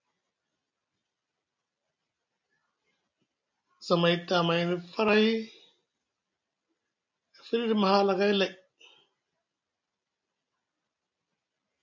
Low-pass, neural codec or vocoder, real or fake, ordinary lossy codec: 7.2 kHz; none; real; MP3, 64 kbps